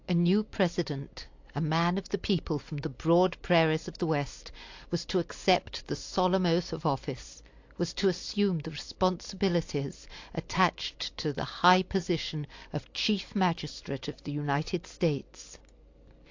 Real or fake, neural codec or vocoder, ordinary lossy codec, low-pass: real; none; AAC, 48 kbps; 7.2 kHz